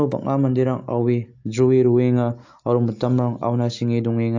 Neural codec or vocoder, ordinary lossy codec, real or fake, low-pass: none; AAC, 48 kbps; real; 7.2 kHz